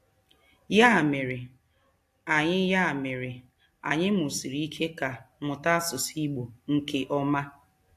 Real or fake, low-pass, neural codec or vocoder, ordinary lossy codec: real; 14.4 kHz; none; AAC, 64 kbps